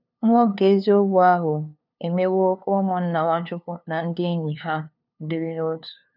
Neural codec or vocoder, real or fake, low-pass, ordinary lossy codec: codec, 16 kHz, 2 kbps, FunCodec, trained on LibriTTS, 25 frames a second; fake; 5.4 kHz; none